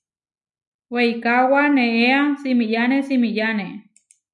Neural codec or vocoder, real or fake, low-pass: none; real; 10.8 kHz